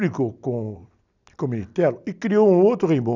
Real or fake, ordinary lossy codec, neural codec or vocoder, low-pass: real; none; none; 7.2 kHz